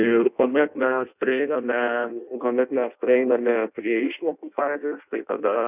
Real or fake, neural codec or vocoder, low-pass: fake; codec, 16 kHz in and 24 kHz out, 0.6 kbps, FireRedTTS-2 codec; 3.6 kHz